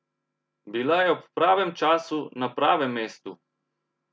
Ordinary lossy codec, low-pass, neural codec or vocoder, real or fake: none; none; none; real